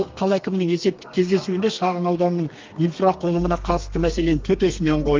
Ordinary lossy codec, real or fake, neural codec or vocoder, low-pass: Opus, 24 kbps; fake; codec, 32 kHz, 1.9 kbps, SNAC; 7.2 kHz